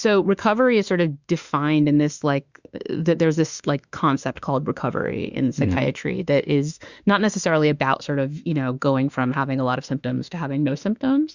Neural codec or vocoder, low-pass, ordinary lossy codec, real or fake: autoencoder, 48 kHz, 32 numbers a frame, DAC-VAE, trained on Japanese speech; 7.2 kHz; Opus, 64 kbps; fake